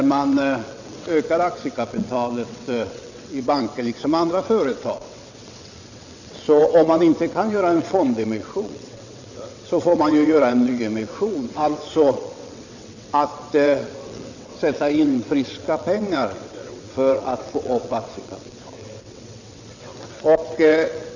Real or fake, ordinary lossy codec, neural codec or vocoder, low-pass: fake; none; vocoder, 22.05 kHz, 80 mel bands, WaveNeXt; 7.2 kHz